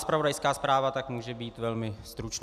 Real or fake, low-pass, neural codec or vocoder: real; 14.4 kHz; none